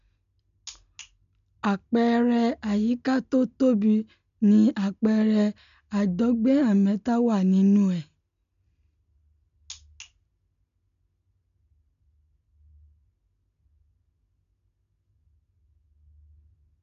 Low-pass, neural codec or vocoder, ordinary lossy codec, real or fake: 7.2 kHz; none; none; real